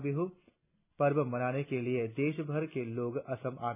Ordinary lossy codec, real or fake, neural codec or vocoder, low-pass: none; real; none; 3.6 kHz